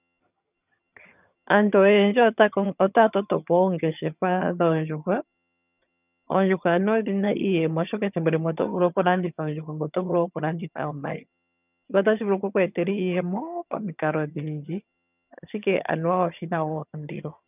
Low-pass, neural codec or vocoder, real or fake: 3.6 kHz; vocoder, 22.05 kHz, 80 mel bands, HiFi-GAN; fake